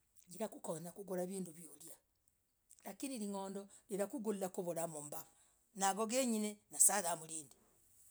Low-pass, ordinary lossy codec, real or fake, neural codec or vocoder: none; none; real; none